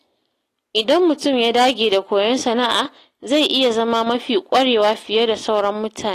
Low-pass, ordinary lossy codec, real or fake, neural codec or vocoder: 14.4 kHz; AAC, 48 kbps; real; none